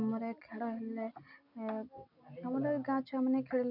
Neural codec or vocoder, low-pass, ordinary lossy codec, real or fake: none; 5.4 kHz; none; real